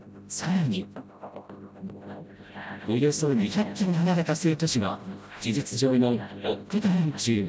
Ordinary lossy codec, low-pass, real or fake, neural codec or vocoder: none; none; fake; codec, 16 kHz, 0.5 kbps, FreqCodec, smaller model